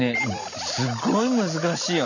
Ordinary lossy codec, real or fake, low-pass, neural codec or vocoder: none; real; 7.2 kHz; none